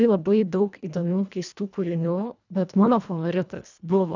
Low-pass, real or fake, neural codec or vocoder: 7.2 kHz; fake; codec, 24 kHz, 1.5 kbps, HILCodec